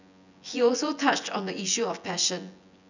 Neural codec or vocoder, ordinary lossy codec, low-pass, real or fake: vocoder, 24 kHz, 100 mel bands, Vocos; none; 7.2 kHz; fake